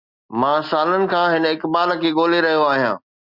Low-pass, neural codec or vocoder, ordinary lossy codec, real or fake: 5.4 kHz; none; Opus, 64 kbps; real